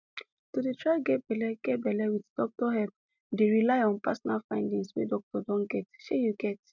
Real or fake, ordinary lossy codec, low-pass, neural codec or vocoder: real; none; 7.2 kHz; none